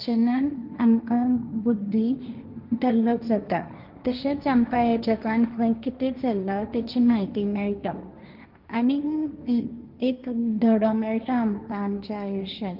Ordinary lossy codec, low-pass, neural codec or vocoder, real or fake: Opus, 24 kbps; 5.4 kHz; codec, 16 kHz, 1.1 kbps, Voila-Tokenizer; fake